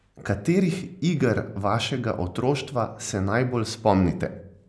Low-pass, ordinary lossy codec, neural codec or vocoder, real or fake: none; none; none; real